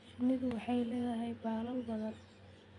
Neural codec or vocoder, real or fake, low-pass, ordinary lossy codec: vocoder, 24 kHz, 100 mel bands, Vocos; fake; 10.8 kHz; none